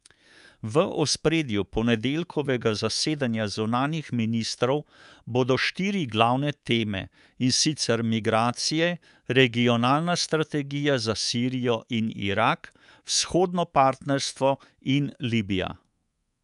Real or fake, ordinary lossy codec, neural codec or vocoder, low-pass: fake; none; codec, 24 kHz, 3.1 kbps, DualCodec; 10.8 kHz